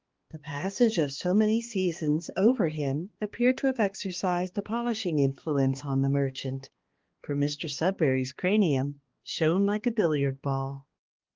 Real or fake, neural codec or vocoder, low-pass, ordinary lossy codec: fake; codec, 16 kHz, 2 kbps, X-Codec, HuBERT features, trained on balanced general audio; 7.2 kHz; Opus, 16 kbps